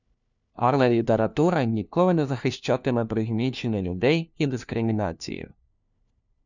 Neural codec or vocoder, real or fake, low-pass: codec, 16 kHz, 1 kbps, FunCodec, trained on LibriTTS, 50 frames a second; fake; 7.2 kHz